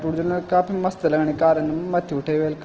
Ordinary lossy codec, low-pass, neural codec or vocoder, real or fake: Opus, 16 kbps; 7.2 kHz; none; real